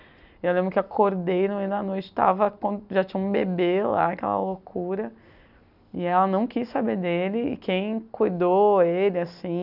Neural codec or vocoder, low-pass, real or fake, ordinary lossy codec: none; 5.4 kHz; real; none